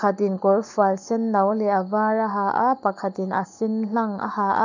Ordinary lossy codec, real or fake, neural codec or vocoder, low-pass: none; fake; codec, 44.1 kHz, 7.8 kbps, Pupu-Codec; 7.2 kHz